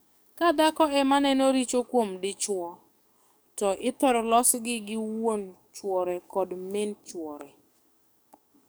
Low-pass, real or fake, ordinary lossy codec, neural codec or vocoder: none; fake; none; codec, 44.1 kHz, 7.8 kbps, DAC